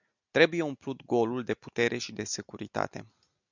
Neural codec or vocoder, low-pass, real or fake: none; 7.2 kHz; real